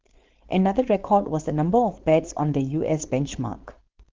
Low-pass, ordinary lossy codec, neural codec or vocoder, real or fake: 7.2 kHz; Opus, 16 kbps; codec, 16 kHz, 4.8 kbps, FACodec; fake